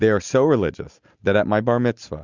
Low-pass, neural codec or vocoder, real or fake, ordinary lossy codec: 7.2 kHz; none; real; Opus, 64 kbps